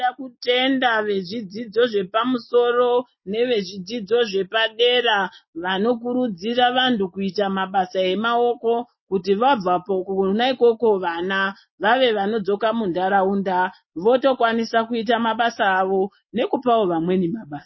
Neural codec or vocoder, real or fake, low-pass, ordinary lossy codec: none; real; 7.2 kHz; MP3, 24 kbps